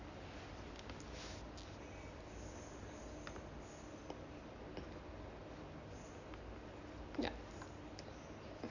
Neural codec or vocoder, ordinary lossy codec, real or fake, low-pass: none; Opus, 64 kbps; real; 7.2 kHz